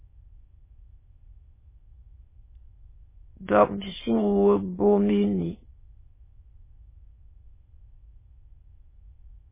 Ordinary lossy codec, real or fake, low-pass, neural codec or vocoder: MP3, 16 kbps; fake; 3.6 kHz; autoencoder, 22.05 kHz, a latent of 192 numbers a frame, VITS, trained on many speakers